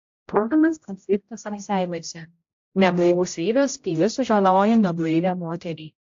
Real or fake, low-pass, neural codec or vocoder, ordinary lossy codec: fake; 7.2 kHz; codec, 16 kHz, 0.5 kbps, X-Codec, HuBERT features, trained on general audio; AAC, 48 kbps